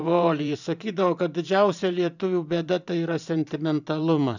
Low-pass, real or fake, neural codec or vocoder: 7.2 kHz; fake; vocoder, 44.1 kHz, 128 mel bands every 512 samples, BigVGAN v2